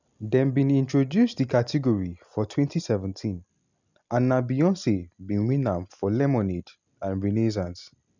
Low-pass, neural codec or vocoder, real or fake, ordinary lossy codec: 7.2 kHz; none; real; none